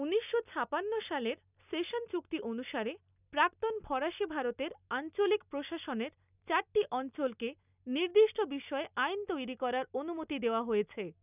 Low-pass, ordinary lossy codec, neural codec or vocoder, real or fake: 3.6 kHz; none; none; real